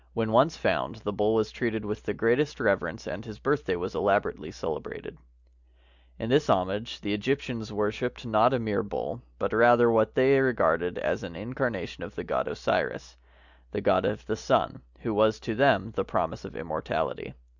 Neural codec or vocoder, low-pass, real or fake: none; 7.2 kHz; real